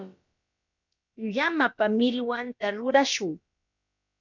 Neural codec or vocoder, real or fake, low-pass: codec, 16 kHz, about 1 kbps, DyCAST, with the encoder's durations; fake; 7.2 kHz